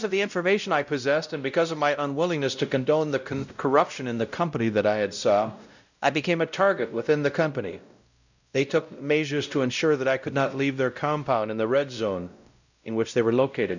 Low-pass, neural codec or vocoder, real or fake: 7.2 kHz; codec, 16 kHz, 0.5 kbps, X-Codec, WavLM features, trained on Multilingual LibriSpeech; fake